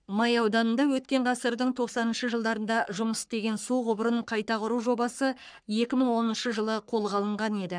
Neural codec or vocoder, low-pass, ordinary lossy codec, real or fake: codec, 44.1 kHz, 3.4 kbps, Pupu-Codec; 9.9 kHz; none; fake